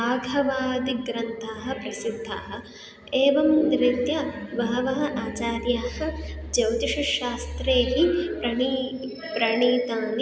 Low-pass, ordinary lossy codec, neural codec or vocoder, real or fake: none; none; none; real